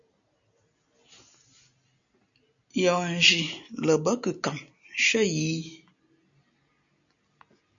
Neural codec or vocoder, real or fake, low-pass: none; real; 7.2 kHz